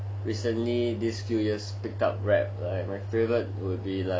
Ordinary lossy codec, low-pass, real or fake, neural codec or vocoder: none; none; real; none